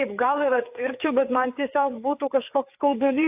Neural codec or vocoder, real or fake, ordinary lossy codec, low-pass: codec, 16 kHz, 4 kbps, FreqCodec, larger model; fake; AAC, 32 kbps; 3.6 kHz